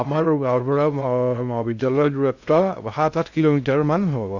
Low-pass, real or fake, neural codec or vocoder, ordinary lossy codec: 7.2 kHz; fake; codec, 16 kHz in and 24 kHz out, 0.6 kbps, FocalCodec, streaming, 2048 codes; none